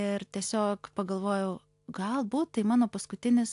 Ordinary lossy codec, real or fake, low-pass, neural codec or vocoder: AAC, 64 kbps; real; 10.8 kHz; none